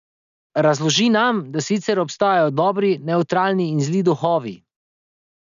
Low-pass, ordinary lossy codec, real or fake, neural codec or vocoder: 7.2 kHz; MP3, 96 kbps; real; none